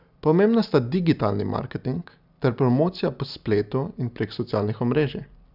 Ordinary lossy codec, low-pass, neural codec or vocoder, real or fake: none; 5.4 kHz; none; real